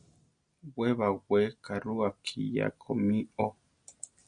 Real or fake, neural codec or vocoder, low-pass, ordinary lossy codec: real; none; 9.9 kHz; MP3, 48 kbps